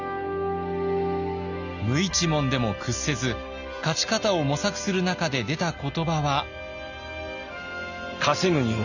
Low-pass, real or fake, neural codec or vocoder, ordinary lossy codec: 7.2 kHz; real; none; none